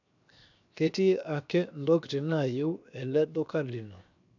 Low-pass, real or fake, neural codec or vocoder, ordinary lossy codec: 7.2 kHz; fake; codec, 16 kHz, 0.7 kbps, FocalCodec; none